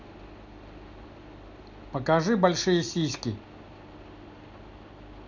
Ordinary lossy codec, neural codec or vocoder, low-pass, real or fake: none; none; 7.2 kHz; real